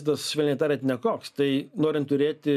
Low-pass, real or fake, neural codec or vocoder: 14.4 kHz; real; none